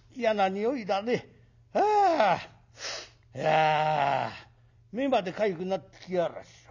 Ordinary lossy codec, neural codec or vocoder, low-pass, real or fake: none; none; 7.2 kHz; real